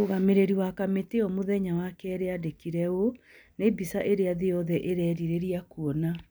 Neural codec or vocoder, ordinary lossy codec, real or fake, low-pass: none; none; real; none